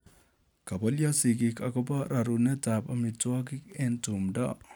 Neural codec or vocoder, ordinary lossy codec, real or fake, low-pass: none; none; real; none